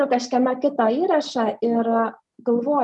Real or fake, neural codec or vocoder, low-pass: real; none; 10.8 kHz